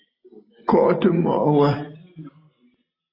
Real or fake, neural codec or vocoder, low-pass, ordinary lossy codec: real; none; 5.4 kHz; AAC, 24 kbps